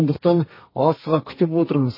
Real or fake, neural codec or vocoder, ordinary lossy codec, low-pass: fake; codec, 32 kHz, 1.9 kbps, SNAC; MP3, 24 kbps; 5.4 kHz